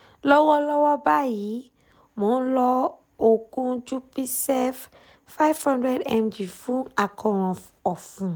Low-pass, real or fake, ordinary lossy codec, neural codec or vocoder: none; real; none; none